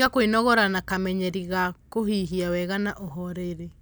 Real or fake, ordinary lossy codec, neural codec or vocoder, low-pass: real; none; none; none